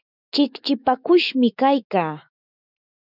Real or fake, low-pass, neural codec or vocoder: fake; 5.4 kHz; autoencoder, 48 kHz, 128 numbers a frame, DAC-VAE, trained on Japanese speech